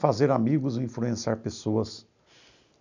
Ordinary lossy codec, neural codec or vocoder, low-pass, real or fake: none; none; 7.2 kHz; real